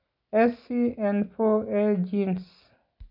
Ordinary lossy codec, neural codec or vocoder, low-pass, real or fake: none; none; 5.4 kHz; real